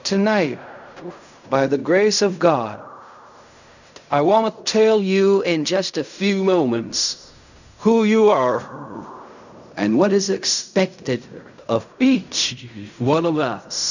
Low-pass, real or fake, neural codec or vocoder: 7.2 kHz; fake; codec, 16 kHz in and 24 kHz out, 0.4 kbps, LongCat-Audio-Codec, fine tuned four codebook decoder